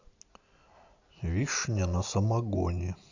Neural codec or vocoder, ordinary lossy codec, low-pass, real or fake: none; none; 7.2 kHz; real